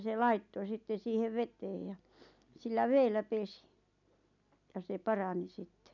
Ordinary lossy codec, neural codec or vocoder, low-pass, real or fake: none; none; 7.2 kHz; real